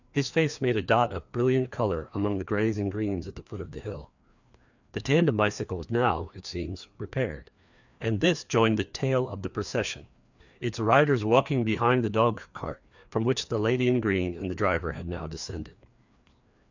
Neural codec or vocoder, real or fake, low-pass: codec, 16 kHz, 2 kbps, FreqCodec, larger model; fake; 7.2 kHz